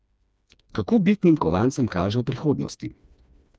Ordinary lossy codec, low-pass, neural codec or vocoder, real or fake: none; none; codec, 16 kHz, 2 kbps, FreqCodec, smaller model; fake